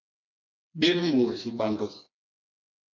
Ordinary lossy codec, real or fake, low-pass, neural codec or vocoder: MP3, 64 kbps; fake; 7.2 kHz; codec, 16 kHz, 2 kbps, FreqCodec, smaller model